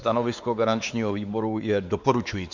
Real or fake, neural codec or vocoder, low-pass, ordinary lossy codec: fake; codec, 16 kHz, 4 kbps, X-Codec, WavLM features, trained on Multilingual LibriSpeech; 7.2 kHz; Opus, 64 kbps